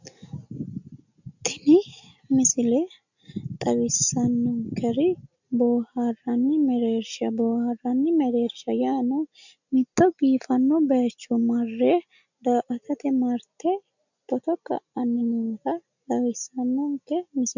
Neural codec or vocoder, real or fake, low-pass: none; real; 7.2 kHz